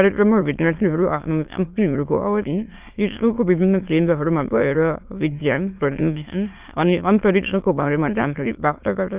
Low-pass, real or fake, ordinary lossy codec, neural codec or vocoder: 3.6 kHz; fake; Opus, 32 kbps; autoencoder, 22.05 kHz, a latent of 192 numbers a frame, VITS, trained on many speakers